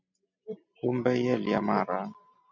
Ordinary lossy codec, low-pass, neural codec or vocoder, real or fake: MP3, 64 kbps; 7.2 kHz; none; real